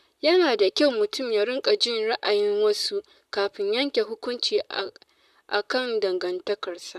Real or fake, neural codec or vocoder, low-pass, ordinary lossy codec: fake; vocoder, 44.1 kHz, 128 mel bands, Pupu-Vocoder; 14.4 kHz; none